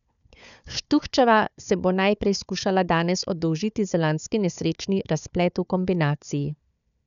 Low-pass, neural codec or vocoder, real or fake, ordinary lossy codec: 7.2 kHz; codec, 16 kHz, 4 kbps, FunCodec, trained on Chinese and English, 50 frames a second; fake; none